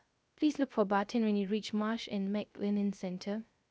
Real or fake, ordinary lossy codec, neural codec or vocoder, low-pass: fake; none; codec, 16 kHz, 0.3 kbps, FocalCodec; none